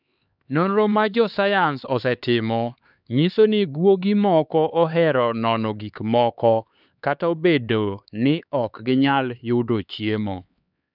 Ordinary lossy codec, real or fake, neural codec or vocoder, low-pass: none; fake; codec, 16 kHz, 4 kbps, X-Codec, HuBERT features, trained on LibriSpeech; 5.4 kHz